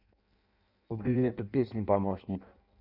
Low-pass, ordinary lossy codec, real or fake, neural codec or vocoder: 5.4 kHz; none; fake; codec, 16 kHz in and 24 kHz out, 0.6 kbps, FireRedTTS-2 codec